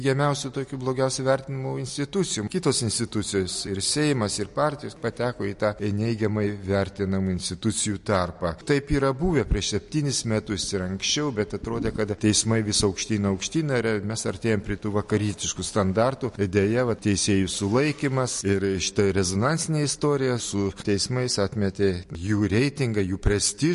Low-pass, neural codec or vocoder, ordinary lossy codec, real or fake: 14.4 kHz; none; MP3, 48 kbps; real